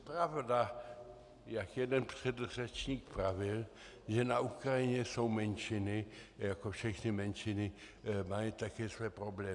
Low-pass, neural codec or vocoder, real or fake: 10.8 kHz; none; real